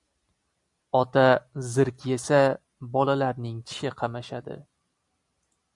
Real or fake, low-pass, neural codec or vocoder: real; 10.8 kHz; none